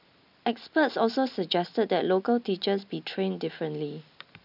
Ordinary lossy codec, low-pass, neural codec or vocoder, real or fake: none; 5.4 kHz; none; real